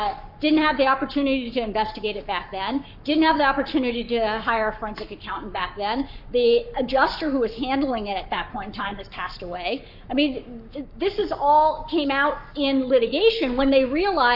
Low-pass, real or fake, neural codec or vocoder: 5.4 kHz; fake; codec, 44.1 kHz, 7.8 kbps, Pupu-Codec